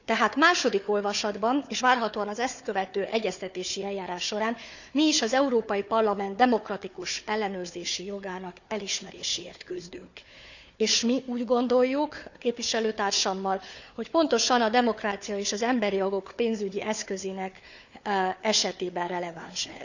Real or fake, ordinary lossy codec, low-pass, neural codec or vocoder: fake; none; 7.2 kHz; codec, 16 kHz, 4 kbps, FunCodec, trained on Chinese and English, 50 frames a second